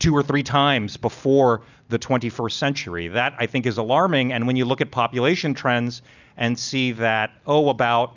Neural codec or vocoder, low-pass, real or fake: none; 7.2 kHz; real